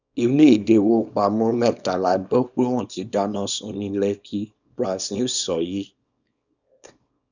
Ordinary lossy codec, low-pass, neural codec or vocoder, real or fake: none; 7.2 kHz; codec, 24 kHz, 0.9 kbps, WavTokenizer, small release; fake